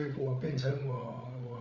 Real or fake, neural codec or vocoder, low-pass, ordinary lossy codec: fake; codec, 16 kHz, 8 kbps, FreqCodec, larger model; 7.2 kHz; none